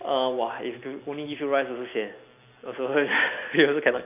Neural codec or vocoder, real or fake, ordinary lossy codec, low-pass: none; real; none; 3.6 kHz